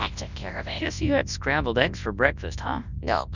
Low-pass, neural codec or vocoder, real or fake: 7.2 kHz; codec, 24 kHz, 0.9 kbps, WavTokenizer, large speech release; fake